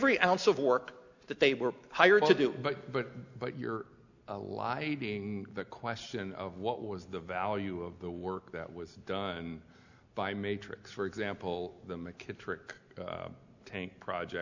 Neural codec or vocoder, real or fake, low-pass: none; real; 7.2 kHz